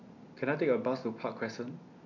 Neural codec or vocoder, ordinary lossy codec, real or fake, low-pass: none; none; real; 7.2 kHz